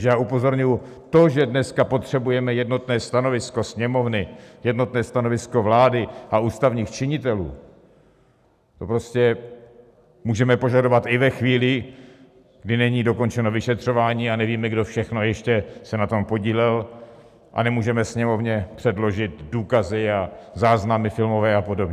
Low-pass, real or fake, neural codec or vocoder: 14.4 kHz; real; none